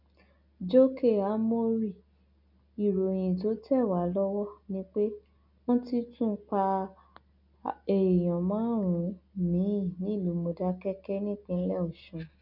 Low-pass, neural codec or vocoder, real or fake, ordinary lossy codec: 5.4 kHz; none; real; none